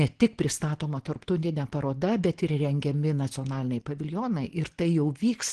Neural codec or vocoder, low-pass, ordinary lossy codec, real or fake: none; 9.9 kHz; Opus, 16 kbps; real